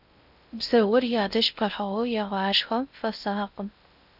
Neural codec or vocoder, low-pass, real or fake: codec, 16 kHz in and 24 kHz out, 0.6 kbps, FocalCodec, streaming, 2048 codes; 5.4 kHz; fake